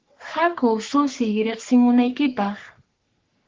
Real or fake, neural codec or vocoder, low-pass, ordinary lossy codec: fake; codec, 44.1 kHz, 3.4 kbps, Pupu-Codec; 7.2 kHz; Opus, 16 kbps